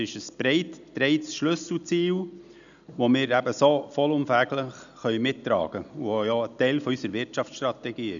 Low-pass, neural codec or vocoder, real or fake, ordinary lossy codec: 7.2 kHz; none; real; none